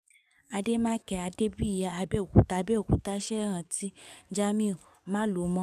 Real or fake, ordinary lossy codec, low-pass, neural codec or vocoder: fake; none; 14.4 kHz; codec, 44.1 kHz, 7.8 kbps, DAC